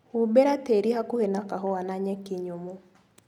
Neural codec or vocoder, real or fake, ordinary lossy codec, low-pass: none; real; none; 19.8 kHz